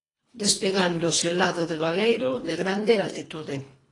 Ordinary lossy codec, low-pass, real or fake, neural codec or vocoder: AAC, 32 kbps; 10.8 kHz; fake; codec, 24 kHz, 1.5 kbps, HILCodec